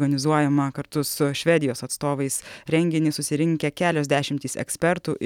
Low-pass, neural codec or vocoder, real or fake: 19.8 kHz; none; real